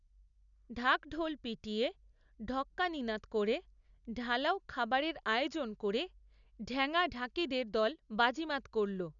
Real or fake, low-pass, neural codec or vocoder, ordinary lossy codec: real; 7.2 kHz; none; none